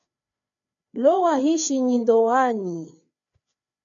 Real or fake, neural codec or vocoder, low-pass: fake; codec, 16 kHz, 4 kbps, FreqCodec, larger model; 7.2 kHz